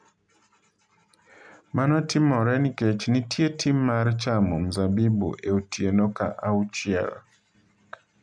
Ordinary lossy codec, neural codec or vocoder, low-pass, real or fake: none; none; 9.9 kHz; real